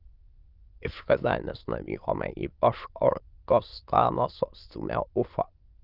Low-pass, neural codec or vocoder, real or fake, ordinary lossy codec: 5.4 kHz; autoencoder, 22.05 kHz, a latent of 192 numbers a frame, VITS, trained on many speakers; fake; Opus, 32 kbps